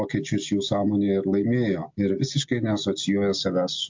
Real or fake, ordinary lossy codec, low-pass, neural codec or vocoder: real; MP3, 48 kbps; 7.2 kHz; none